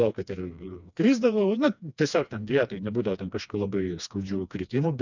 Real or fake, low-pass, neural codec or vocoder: fake; 7.2 kHz; codec, 16 kHz, 2 kbps, FreqCodec, smaller model